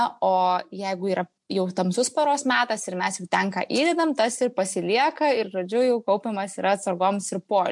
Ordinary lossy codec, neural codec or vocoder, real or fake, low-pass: MP3, 64 kbps; none; real; 10.8 kHz